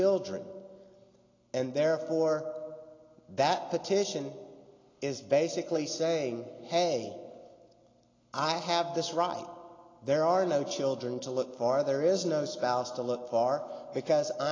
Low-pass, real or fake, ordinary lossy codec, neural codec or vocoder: 7.2 kHz; real; AAC, 32 kbps; none